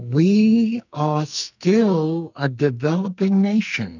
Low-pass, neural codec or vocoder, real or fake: 7.2 kHz; codec, 44.1 kHz, 2.6 kbps, SNAC; fake